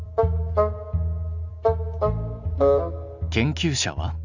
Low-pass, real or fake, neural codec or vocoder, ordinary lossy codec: 7.2 kHz; real; none; none